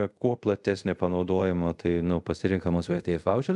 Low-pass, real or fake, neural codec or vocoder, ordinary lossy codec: 10.8 kHz; fake; codec, 24 kHz, 0.5 kbps, DualCodec; MP3, 96 kbps